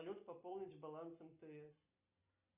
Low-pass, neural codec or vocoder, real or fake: 3.6 kHz; none; real